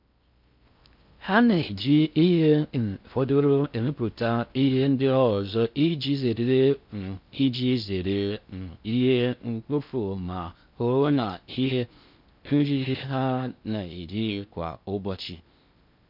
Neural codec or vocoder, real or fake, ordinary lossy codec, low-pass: codec, 16 kHz in and 24 kHz out, 0.6 kbps, FocalCodec, streaming, 4096 codes; fake; MP3, 48 kbps; 5.4 kHz